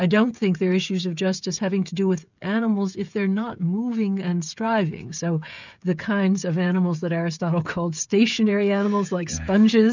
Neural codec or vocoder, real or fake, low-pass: codec, 16 kHz, 16 kbps, FreqCodec, smaller model; fake; 7.2 kHz